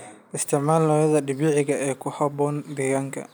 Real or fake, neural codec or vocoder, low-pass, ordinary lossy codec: real; none; none; none